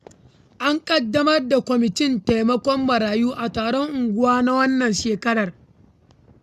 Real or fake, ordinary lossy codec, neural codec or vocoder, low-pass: real; none; none; 14.4 kHz